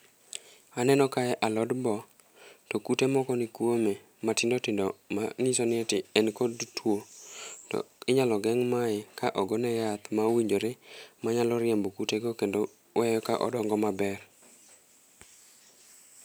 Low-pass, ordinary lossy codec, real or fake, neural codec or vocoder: none; none; real; none